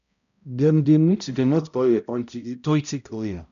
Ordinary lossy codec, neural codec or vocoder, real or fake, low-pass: none; codec, 16 kHz, 0.5 kbps, X-Codec, HuBERT features, trained on balanced general audio; fake; 7.2 kHz